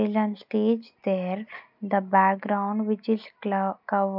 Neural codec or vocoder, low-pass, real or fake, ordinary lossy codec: none; 5.4 kHz; real; none